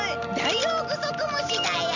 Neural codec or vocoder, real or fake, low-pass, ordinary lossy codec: none; real; 7.2 kHz; none